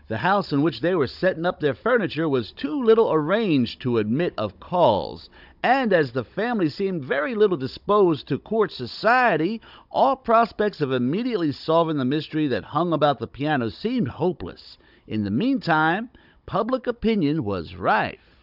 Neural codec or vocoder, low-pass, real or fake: codec, 16 kHz, 16 kbps, FunCodec, trained on Chinese and English, 50 frames a second; 5.4 kHz; fake